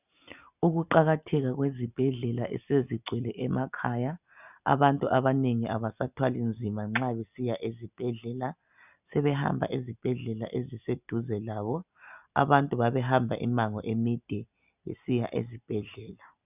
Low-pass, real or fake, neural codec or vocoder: 3.6 kHz; real; none